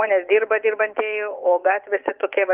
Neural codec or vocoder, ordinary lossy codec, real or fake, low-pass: vocoder, 24 kHz, 100 mel bands, Vocos; Opus, 32 kbps; fake; 3.6 kHz